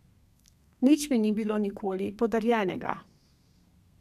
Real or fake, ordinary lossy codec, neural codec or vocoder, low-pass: fake; none; codec, 32 kHz, 1.9 kbps, SNAC; 14.4 kHz